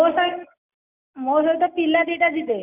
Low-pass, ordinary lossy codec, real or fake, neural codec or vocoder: 3.6 kHz; none; real; none